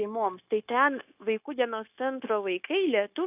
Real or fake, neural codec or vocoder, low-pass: fake; codec, 16 kHz, 0.9 kbps, LongCat-Audio-Codec; 3.6 kHz